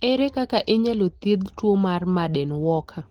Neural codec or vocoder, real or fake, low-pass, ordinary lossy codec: none; real; 19.8 kHz; Opus, 16 kbps